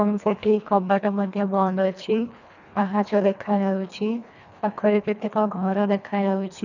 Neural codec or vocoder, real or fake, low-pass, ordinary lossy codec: codec, 24 kHz, 1.5 kbps, HILCodec; fake; 7.2 kHz; none